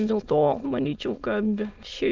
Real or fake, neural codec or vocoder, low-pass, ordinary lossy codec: fake; autoencoder, 22.05 kHz, a latent of 192 numbers a frame, VITS, trained on many speakers; 7.2 kHz; Opus, 32 kbps